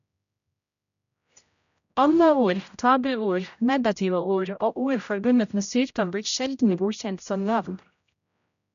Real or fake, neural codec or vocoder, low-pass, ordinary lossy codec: fake; codec, 16 kHz, 0.5 kbps, X-Codec, HuBERT features, trained on general audio; 7.2 kHz; none